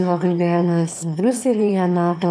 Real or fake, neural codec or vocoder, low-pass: fake; autoencoder, 22.05 kHz, a latent of 192 numbers a frame, VITS, trained on one speaker; 9.9 kHz